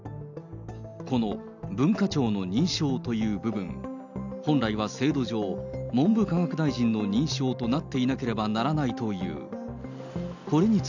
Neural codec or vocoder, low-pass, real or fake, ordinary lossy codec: none; 7.2 kHz; real; none